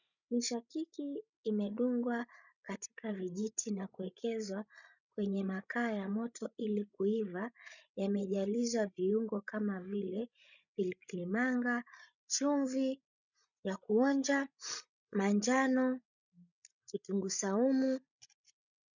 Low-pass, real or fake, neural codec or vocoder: 7.2 kHz; fake; codec, 16 kHz, 16 kbps, FreqCodec, larger model